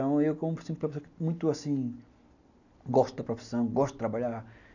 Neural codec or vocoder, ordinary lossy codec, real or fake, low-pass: none; none; real; 7.2 kHz